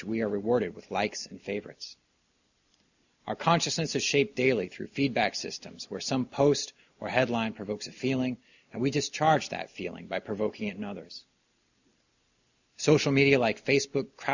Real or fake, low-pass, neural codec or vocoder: real; 7.2 kHz; none